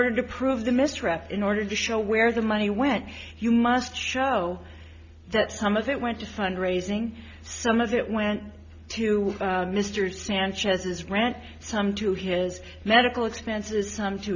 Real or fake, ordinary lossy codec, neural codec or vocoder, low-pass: real; MP3, 64 kbps; none; 7.2 kHz